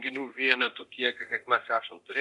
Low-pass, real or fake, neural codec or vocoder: 10.8 kHz; fake; codec, 24 kHz, 0.9 kbps, DualCodec